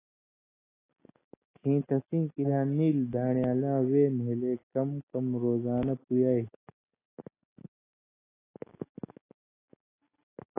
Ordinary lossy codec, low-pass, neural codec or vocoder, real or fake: AAC, 16 kbps; 3.6 kHz; none; real